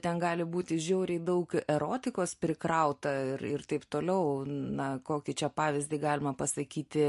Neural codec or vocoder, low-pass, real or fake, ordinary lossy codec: none; 14.4 kHz; real; MP3, 48 kbps